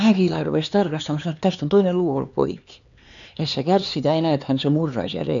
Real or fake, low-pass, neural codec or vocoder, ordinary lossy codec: fake; 7.2 kHz; codec, 16 kHz, 4 kbps, X-Codec, HuBERT features, trained on LibriSpeech; none